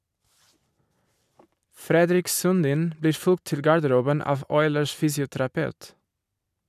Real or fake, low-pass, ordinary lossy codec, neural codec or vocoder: fake; 14.4 kHz; none; vocoder, 44.1 kHz, 128 mel bands every 256 samples, BigVGAN v2